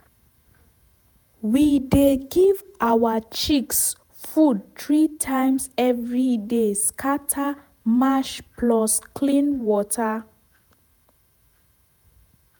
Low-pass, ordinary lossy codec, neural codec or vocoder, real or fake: none; none; vocoder, 48 kHz, 128 mel bands, Vocos; fake